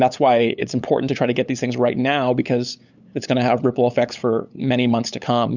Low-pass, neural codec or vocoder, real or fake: 7.2 kHz; codec, 16 kHz, 8 kbps, FunCodec, trained on LibriTTS, 25 frames a second; fake